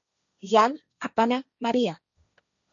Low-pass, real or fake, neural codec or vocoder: 7.2 kHz; fake; codec, 16 kHz, 1.1 kbps, Voila-Tokenizer